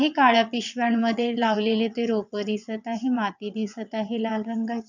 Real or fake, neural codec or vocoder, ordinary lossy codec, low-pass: fake; vocoder, 22.05 kHz, 80 mel bands, WaveNeXt; none; 7.2 kHz